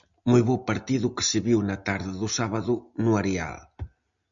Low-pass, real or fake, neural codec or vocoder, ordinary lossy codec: 7.2 kHz; real; none; MP3, 96 kbps